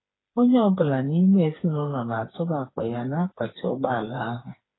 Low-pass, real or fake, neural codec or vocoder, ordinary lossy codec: 7.2 kHz; fake; codec, 16 kHz, 4 kbps, FreqCodec, smaller model; AAC, 16 kbps